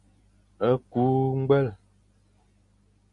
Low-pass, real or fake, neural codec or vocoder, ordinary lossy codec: 10.8 kHz; real; none; MP3, 48 kbps